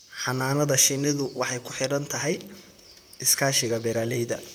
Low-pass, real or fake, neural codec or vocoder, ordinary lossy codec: none; fake; vocoder, 44.1 kHz, 128 mel bands, Pupu-Vocoder; none